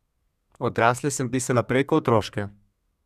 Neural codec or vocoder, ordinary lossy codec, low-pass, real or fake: codec, 32 kHz, 1.9 kbps, SNAC; none; 14.4 kHz; fake